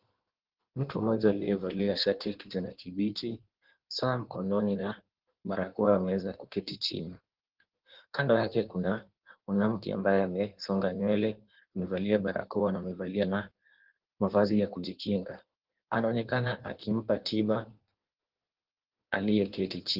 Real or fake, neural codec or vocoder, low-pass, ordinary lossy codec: fake; codec, 16 kHz in and 24 kHz out, 1.1 kbps, FireRedTTS-2 codec; 5.4 kHz; Opus, 16 kbps